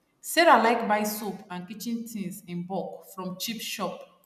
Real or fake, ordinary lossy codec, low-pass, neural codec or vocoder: real; none; 14.4 kHz; none